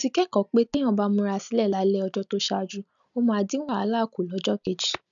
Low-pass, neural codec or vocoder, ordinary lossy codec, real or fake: 7.2 kHz; none; none; real